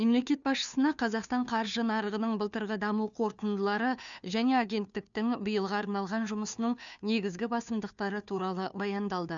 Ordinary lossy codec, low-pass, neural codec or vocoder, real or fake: none; 7.2 kHz; codec, 16 kHz, 2 kbps, FunCodec, trained on Chinese and English, 25 frames a second; fake